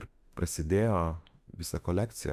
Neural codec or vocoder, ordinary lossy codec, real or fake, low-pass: autoencoder, 48 kHz, 32 numbers a frame, DAC-VAE, trained on Japanese speech; Opus, 64 kbps; fake; 14.4 kHz